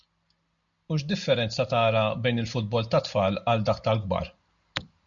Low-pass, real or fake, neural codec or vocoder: 7.2 kHz; real; none